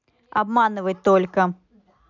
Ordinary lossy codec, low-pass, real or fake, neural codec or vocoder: none; 7.2 kHz; real; none